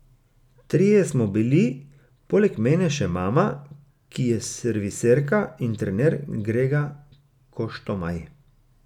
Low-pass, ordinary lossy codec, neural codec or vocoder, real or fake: 19.8 kHz; none; none; real